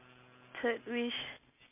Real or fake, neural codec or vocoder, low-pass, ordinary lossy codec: real; none; 3.6 kHz; MP3, 32 kbps